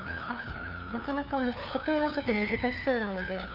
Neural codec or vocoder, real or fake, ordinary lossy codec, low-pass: codec, 16 kHz, 2 kbps, FunCodec, trained on LibriTTS, 25 frames a second; fake; none; 5.4 kHz